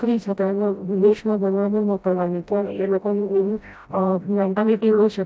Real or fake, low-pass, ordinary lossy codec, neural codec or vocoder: fake; none; none; codec, 16 kHz, 0.5 kbps, FreqCodec, smaller model